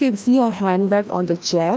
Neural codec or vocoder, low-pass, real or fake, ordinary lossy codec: codec, 16 kHz, 1 kbps, FreqCodec, larger model; none; fake; none